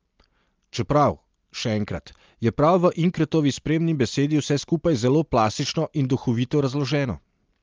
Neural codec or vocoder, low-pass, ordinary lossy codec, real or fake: none; 7.2 kHz; Opus, 24 kbps; real